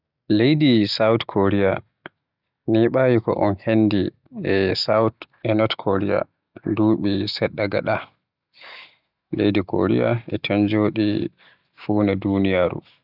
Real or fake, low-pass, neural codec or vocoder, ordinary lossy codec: real; 5.4 kHz; none; none